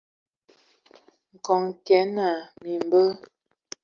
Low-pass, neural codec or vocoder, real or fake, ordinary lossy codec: 7.2 kHz; none; real; Opus, 32 kbps